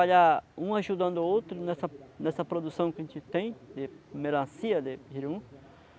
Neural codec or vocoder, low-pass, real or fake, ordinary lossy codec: none; none; real; none